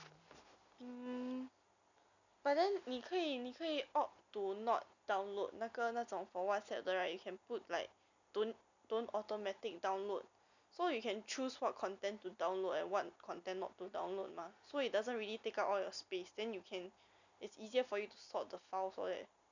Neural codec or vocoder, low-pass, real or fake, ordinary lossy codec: none; 7.2 kHz; real; none